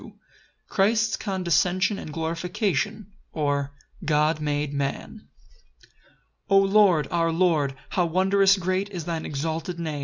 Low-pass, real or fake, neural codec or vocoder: 7.2 kHz; real; none